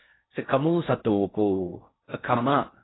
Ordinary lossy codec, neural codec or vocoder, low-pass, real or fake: AAC, 16 kbps; codec, 16 kHz in and 24 kHz out, 0.6 kbps, FocalCodec, streaming, 4096 codes; 7.2 kHz; fake